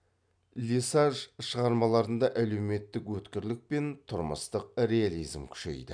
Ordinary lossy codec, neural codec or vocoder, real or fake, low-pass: none; none; real; 9.9 kHz